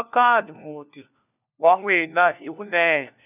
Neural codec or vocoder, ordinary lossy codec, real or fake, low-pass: codec, 16 kHz, 1 kbps, FunCodec, trained on LibriTTS, 50 frames a second; none; fake; 3.6 kHz